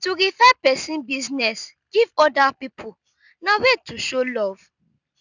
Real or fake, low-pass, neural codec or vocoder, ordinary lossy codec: real; 7.2 kHz; none; none